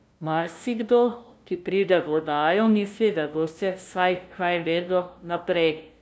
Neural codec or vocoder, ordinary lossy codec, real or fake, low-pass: codec, 16 kHz, 0.5 kbps, FunCodec, trained on LibriTTS, 25 frames a second; none; fake; none